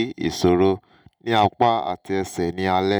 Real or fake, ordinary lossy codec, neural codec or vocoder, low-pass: real; none; none; none